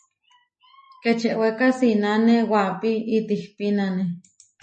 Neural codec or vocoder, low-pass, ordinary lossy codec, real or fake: none; 10.8 kHz; MP3, 32 kbps; real